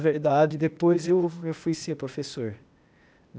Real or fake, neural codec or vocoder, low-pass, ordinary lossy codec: fake; codec, 16 kHz, 0.8 kbps, ZipCodec; none; none